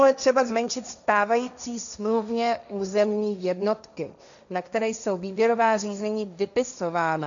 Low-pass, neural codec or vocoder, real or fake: 7.2 kHz; codec, 16 kHz, 1.1 kbps, Voila-Tokenizer; fake